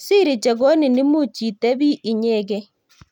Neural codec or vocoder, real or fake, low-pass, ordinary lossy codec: none; real; 19.8 kHz; none